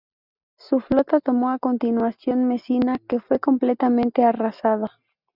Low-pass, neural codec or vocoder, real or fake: 5.4 kHz; none; real